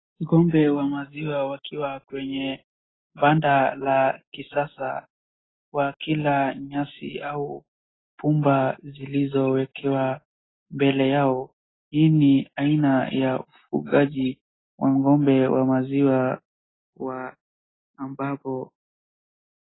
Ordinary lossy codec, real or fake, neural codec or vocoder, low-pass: AAC, 16 kbps; real; none; 7.2 kHz